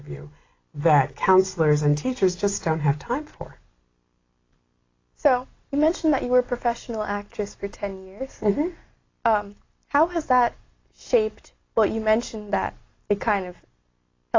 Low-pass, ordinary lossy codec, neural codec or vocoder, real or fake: 7.2 kHz; AAC, 32 kbps; none; real